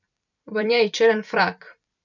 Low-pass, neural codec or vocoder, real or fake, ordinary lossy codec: 7.2 kHz; vocoder, 44.1 kHz, 128 mel bands every 256 samples, BigVGAN v2; fake; none